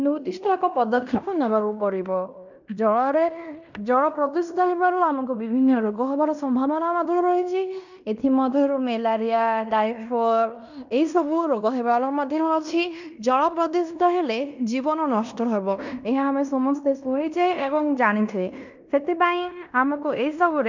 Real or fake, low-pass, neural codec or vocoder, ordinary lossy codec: fake; 7.2 kHz; codec, 16 kHz in and 24 kHz out, 0.9 kbps, LongCat-Audio-Codec, fine tuned four codebook decoder; none